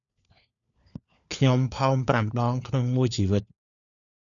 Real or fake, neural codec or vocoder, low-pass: fake; codec, 16 kHz, 4 kbps, FunCodec, trained on LibriTTS, 50 frames a second; 7.2 kHz